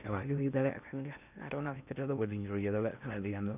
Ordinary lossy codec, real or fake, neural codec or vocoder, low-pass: none; fake; codec, 16 kHz in and 24 kHz out, 0.8 kbps, FocalCodec, streaming, 65536 codes; 3.6 kHz